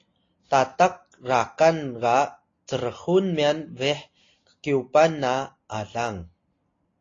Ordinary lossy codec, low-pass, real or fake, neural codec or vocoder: AAC, 32 kbps; 7.2 kHz; real; none